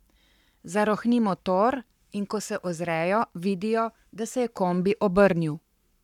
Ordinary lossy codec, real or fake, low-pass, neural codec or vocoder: none; fake; 19.8 kHz; codec, 44.1 kHz, 7.8 kbps, Pupu-Codec